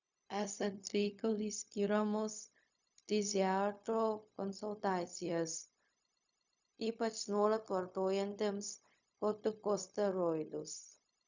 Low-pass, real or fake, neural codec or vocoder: 7.2 kHz; fake; codec, 16 kHz, 0.4 kbps, LongCat-Audio-Codec